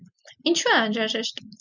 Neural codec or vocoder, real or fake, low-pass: none; real; 7.2 kHz